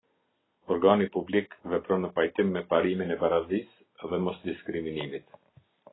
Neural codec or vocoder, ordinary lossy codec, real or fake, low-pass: none; AAC, 16 kbps; real; 7.2 kHz